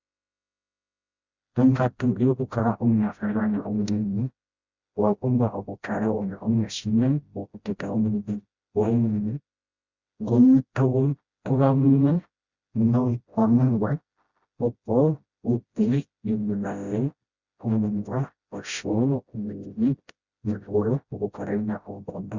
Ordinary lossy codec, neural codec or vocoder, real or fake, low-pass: Opus, 64 kbps; codec, 16 kHz, 0.5 kbps, FreqCodec, smaller model; fake; 7.2 kHz